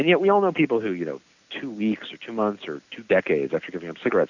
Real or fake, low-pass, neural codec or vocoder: real; 7.2 kHz; none